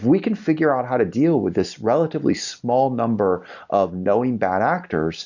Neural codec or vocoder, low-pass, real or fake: none; 7.2 kHz; real